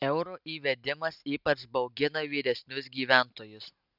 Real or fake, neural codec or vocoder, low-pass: real; none; 5.4 kHz